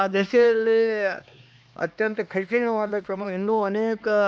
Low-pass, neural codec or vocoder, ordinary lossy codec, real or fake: none; codec, 16 kHz, 2 kbps, X-Codec, HuBERT features, trained on LibriSpeech; none; fake